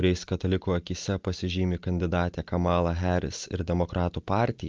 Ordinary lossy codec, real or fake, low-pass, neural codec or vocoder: Opus, 24 kbps; real; 7.2 kHz; none